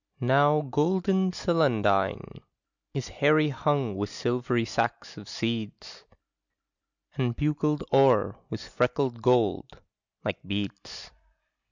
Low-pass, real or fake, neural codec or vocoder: 7.2 kHz; real; none